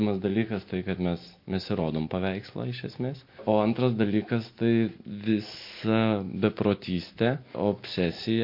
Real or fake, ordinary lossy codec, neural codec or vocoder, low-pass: real; MP3, 32 kbps; none; 5.4 kHz